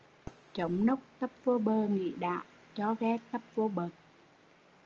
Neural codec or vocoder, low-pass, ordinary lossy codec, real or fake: none; 7.2 kHz; Opus, 24 kbps; real